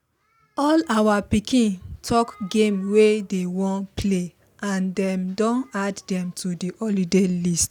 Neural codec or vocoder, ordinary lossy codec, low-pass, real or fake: none; none; none; real